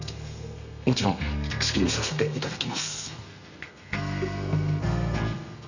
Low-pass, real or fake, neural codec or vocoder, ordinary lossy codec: 7.2 kHz; fake; codec, 32 kHz, 1.9 kbps, SNAC; none